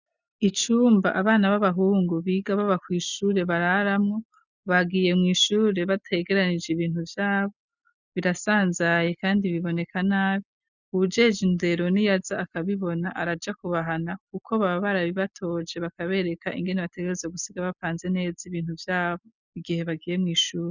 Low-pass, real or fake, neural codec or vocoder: 7.2 kHz; real; none